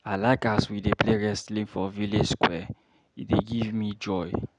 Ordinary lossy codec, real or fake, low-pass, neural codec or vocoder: none; real; 9.9 kHz; none